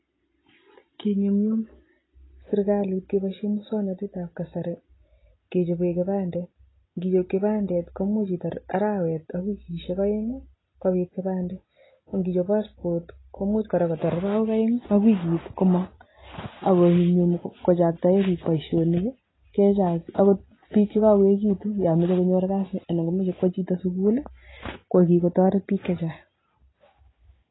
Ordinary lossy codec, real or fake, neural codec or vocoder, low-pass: AAC, 16 kbps; real; none; 7.2 kHz